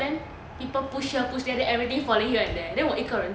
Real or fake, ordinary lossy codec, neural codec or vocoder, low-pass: real; none; none; none